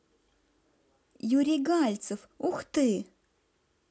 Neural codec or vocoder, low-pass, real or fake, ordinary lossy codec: none; none; real; none